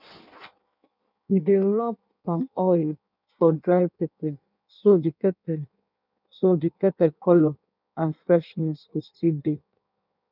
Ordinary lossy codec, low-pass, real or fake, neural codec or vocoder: none; 5.4 kHz; fake; codec, 16 kHz in and 24 kHz out, 1.1 kbps, FireRedTTS-2 codec